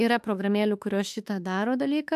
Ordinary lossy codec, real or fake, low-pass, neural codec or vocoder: AAC, 96 kbps; fake; 14.4 kHz; autoencoder, 48 kHz, 32 numbers a frame, DAC-VAE, trained on Japanese speech